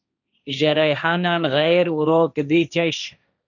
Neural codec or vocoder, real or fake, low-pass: codec, 16 kHz, 1.1 kbps, Voila-Tokenizer; fake; 7.2 kHz